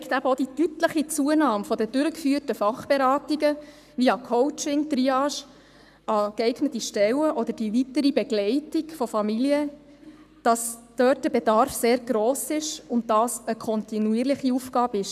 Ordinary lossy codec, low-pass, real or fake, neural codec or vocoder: none; 14.4 kHz; fake; codec, 44.1 kHz, 7.8 kbps, Pupu-Codec